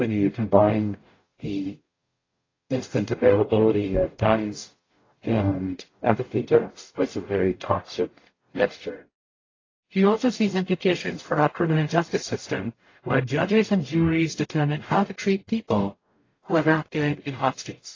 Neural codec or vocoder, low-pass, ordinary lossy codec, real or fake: codec, 44.1 kHz, 0.9 kbps, DAC; 7.2 kHz; AAC, 32 kbps; fake